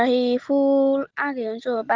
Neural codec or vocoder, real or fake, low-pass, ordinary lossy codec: none; real; 7.2 kHz; Opus, 16 kbps